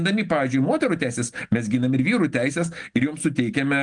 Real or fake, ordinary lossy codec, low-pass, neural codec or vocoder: real; Opus, 24 kbps; 9.9 kHz; none